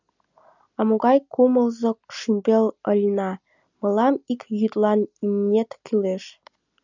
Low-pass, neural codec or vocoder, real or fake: 7.2 kHz; none; real